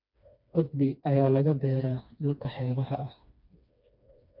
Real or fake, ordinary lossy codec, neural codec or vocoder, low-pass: fake; MP3, 48 kbps; codec, 16 kHz, 2 kbps, FreqCodec, smaller model; 5.4 kHz